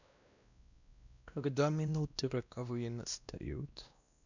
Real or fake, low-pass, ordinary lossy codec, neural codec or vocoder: fake; 7.2 kHz; none; codec, 16 kHz, 1 kbps, X-Codec, WavLM features, trained on Multilingual LibriSpeech